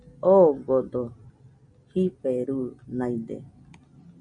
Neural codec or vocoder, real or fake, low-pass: none; real; 9.9 kHz